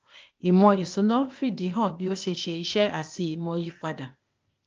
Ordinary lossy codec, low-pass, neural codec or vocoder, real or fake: Opus, 24 kbps; 7.2 kHz; codec, 16 kHz, 0.8 kbps, ZipCodec; fake